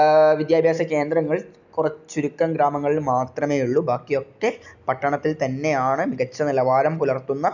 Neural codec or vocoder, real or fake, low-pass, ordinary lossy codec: none; real; 7.2 kHz; none